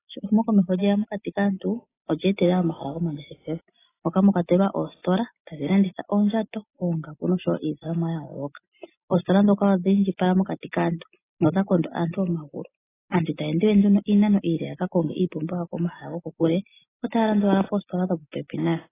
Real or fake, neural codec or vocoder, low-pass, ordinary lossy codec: real; none; 3.6 kHz; AAC, 16 kbps